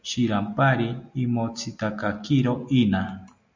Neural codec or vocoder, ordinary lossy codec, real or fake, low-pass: none; MP3, 64 kbps; real; 7.2 kHz